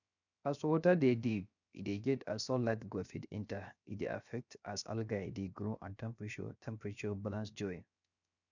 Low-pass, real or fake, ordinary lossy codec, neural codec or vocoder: 7.2 kHz; fake; none; codec, 16 kHz, 0.7 kbps, FocalCodec